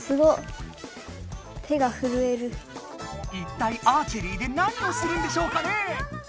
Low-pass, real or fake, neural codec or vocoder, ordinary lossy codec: none; real; none; none